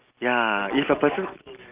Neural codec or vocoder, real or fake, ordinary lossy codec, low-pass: none; real; Opus, 24 kbps; 3.6 kHz